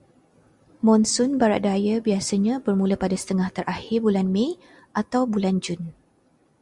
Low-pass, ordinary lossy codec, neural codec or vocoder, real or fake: 10.8 kHz; Opus, 64 kbps; none; real